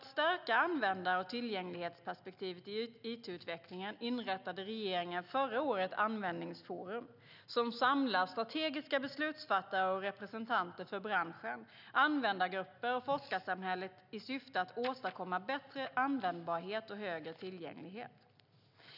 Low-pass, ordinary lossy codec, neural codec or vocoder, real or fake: 5.4 kHz; none; none; real